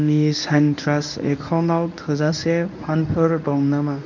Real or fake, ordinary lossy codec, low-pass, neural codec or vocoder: fake; none; 7.2 kHz; codec, 24 kHz, 0.9 kbps, WavTokenizer, medium speech release version 1